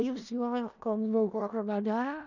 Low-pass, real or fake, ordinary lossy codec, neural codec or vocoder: 7.2 kHz; fake; none; codec, 16 kHz in and 24 kHz out, 0.4 kbps, LongCat-Audio-Codec, four codebook decoder